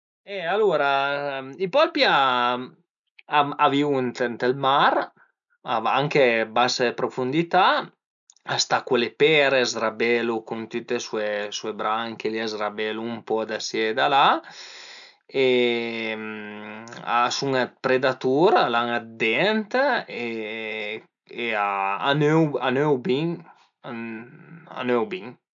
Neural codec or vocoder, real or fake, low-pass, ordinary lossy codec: none; real; 7.2 kHz; none